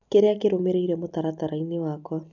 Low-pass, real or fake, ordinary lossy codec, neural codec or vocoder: 7.2 kHz; real; none; none